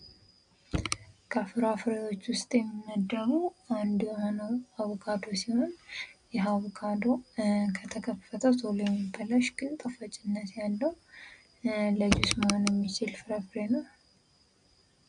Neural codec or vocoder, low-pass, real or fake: none; 9.9 kHz; real